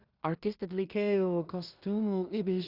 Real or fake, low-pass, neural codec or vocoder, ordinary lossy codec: fake; 5.4 kHz; codec, 16 kHz in and 24 kHz out, 0.4 kbps, LongCat-Audio-Codec, two codebook decoder; Opus, 64 kbps